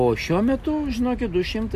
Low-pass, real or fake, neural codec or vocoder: 14.4 kHz; real; none